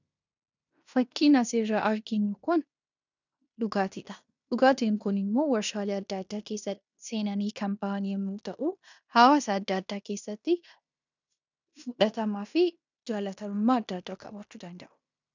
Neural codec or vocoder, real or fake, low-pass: codec, 16 kHz in and 24 kHz out, 0.9 kbps, LongCat-Audio-Codec, fine tuned four codebook decoder; fake; 7.2 kHz